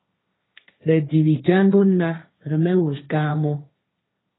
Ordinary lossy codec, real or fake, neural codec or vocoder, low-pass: AAC, 16 kbps; fake; codec, 16 kHz, 1.1 kbps, Voila-Tokenizer; 7.2 kHz